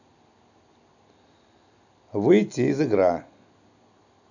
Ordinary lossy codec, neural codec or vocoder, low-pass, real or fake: none; none; 7.2 kHz; real